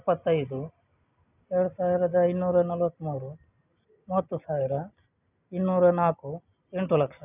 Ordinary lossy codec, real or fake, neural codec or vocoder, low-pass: none; real; none; 3.6 kHz